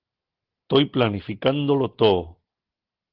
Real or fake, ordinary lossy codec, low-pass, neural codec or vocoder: real; Opus, 16 kbps; 5.4 kHz; none